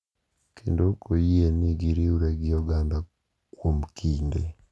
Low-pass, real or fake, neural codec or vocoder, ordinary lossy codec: none; real; none; none